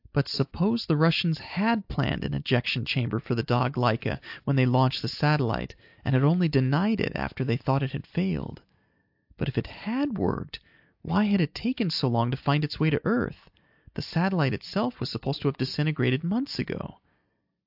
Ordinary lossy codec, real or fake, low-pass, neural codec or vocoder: AAC, 48 kbps; real; 5.4 kHz; none